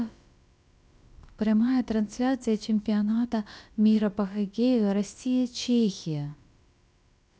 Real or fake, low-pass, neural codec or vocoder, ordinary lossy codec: fake; none; codec, 16 kHz, about 1 kbps, DyCAST, with the encoder's durations; none